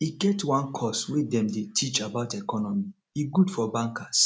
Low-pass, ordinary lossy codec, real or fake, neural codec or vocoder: none; none; real; none